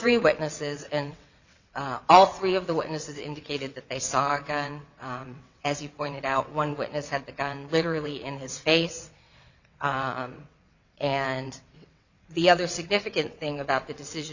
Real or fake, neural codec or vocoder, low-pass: fake; vocoder, 22.05 kHz, 80 mel bands, WaveNeXt; 7.2 kHz